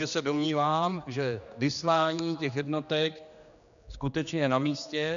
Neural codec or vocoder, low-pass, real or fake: codec, 16 kHz, 2 kbps, X-Codec, HuBERT features, trained on general audio; 7.2 kHz; fake